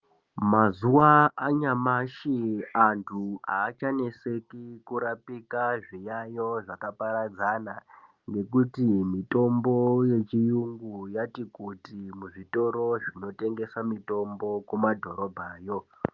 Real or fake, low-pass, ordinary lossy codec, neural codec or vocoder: real; 7.2 kHz; Opus, 32 kbps; none